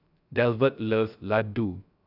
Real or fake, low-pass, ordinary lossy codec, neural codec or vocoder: fake; 5.4 kHz; none; codec, 16 kHz, 0.3 kbps, FocalCodec